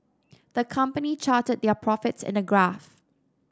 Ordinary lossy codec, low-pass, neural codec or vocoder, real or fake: none; none; none; real